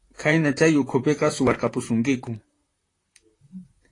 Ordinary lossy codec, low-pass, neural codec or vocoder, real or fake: AAC, 32 kbps; 10.8 kHz; vocoder, 44.1 kHz, 128 mel bands, Pupu-Vocoder; fake